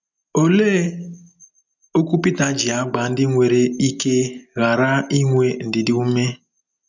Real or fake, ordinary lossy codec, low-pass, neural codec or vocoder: real; none; 7.2 kHz; none